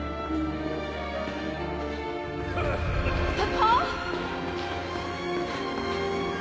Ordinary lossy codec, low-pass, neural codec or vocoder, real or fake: none; none; none; real